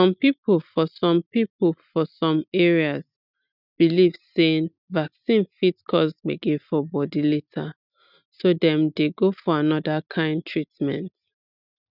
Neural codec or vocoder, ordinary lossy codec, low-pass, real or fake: none; none; 5.4 kHz; real